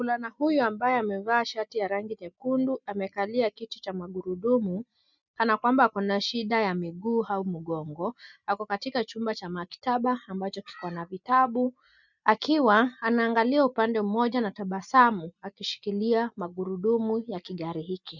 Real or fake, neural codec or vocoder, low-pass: real; none; 7.2 kHz